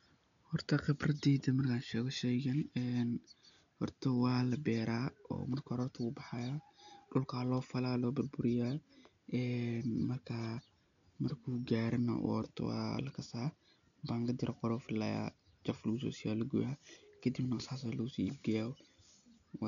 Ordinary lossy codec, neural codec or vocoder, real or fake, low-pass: none; none; real; 7.2 kHz